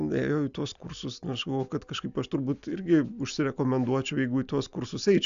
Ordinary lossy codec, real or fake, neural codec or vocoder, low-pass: AAC, 96 kbps; real; none; 7.2 kHz